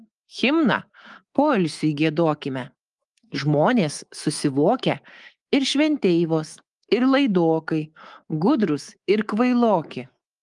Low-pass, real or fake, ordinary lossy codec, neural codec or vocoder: 10.8 kHz; fake; Opus, 24 kbps; codec, 24 kHz, 3.1 kbps, DualCodec